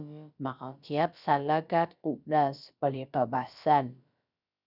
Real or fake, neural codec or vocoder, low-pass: fake; codec, 16 kHz, about 1 kbps, DyCAST, with the encoder's durations; 5.4 kHz